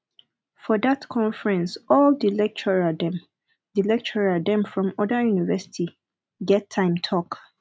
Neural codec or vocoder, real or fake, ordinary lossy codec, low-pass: none; real; none; none